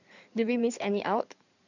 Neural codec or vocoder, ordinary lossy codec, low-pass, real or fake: codec, 16 kHz in and 24 kHz out, 2.2 kbps, FireRedTTS-2 codec; none; 7.2 kHz; fake